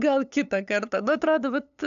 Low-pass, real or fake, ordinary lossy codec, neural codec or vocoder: 7.2 kHz; fake; AAC, 96 kbps; codec, 16 kHz, 8 kbps, FunCodec, trained on LibriTTS, 25 frames a second